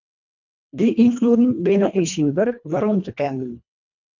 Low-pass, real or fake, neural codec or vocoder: 7.2 kHz; fake; codec, 24 kHz, 1.5 kbps, HILCodec